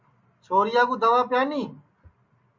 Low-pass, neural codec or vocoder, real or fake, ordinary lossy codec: 7.2 kHz; none; real; MP3, 48 kbps